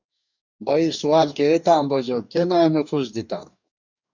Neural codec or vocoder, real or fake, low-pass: codec, 44.1 kHz, 2.6 kbps, DAC; fake; 7.2 kHz